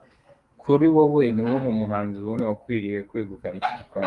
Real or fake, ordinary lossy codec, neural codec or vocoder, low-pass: fake; Opus, 32 kbps; codec, 32 kHz, 1.9 kbps, SNAC; 10.8 kHz